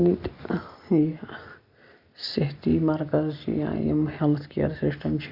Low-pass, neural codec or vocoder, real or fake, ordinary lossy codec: 5.4 kHz; none; real; Opus, 64 kbps